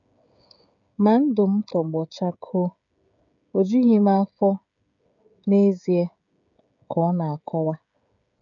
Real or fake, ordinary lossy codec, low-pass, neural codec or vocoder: fake; none; 7.2 kHz; codec, 16 kHz, 16 kbps, FreqCodec, smaller model